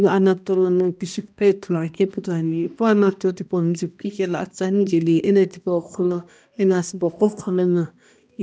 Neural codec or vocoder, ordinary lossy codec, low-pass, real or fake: codec, 16 kHz, 1 kbps, X-Codec, HuBERT features, trained on balanced general audio; none; none; fake